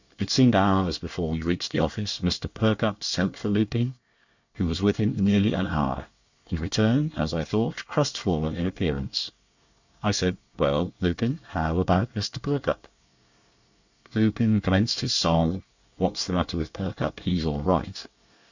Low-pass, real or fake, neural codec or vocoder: 7.2 kHz; fake; codec, 24 kHz, 1 kbps, SNAC